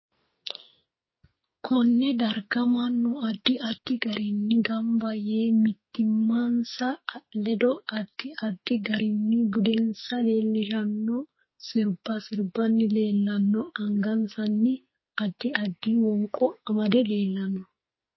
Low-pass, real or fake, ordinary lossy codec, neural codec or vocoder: 7.2 kHz; fake; MP3, 24 kbps; codec, 44.1 kHz, 2.6 kbps, SNAC